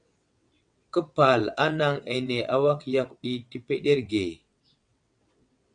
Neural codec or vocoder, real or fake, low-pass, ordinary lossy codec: vocoder, 22.05 kHz, 80 mel bands, WaveNeXt; fake; 9.9 kHz; MP3, 64 kbps